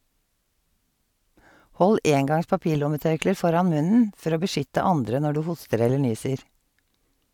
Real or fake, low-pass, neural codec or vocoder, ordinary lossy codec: real; 19.8 kHz; none; none